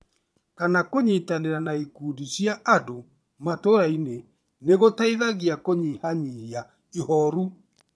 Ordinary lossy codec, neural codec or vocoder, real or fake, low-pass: none; vocoder, 22.05 kHz, 80 mel bands, Vocos; fake; none